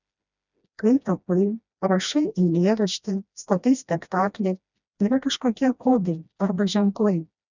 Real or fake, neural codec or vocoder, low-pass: fake; codec, 16 kHz, 1 kbps, FreqCodec, smaller model; 7.2 kHz